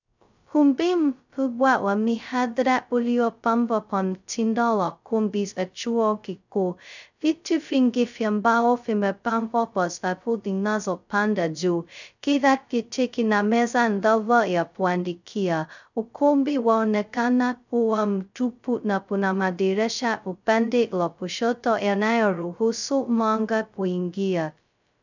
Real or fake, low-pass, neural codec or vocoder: fake; 7.2 kHz; codec, 16 kHz, 0.2 kbps, FocalCodec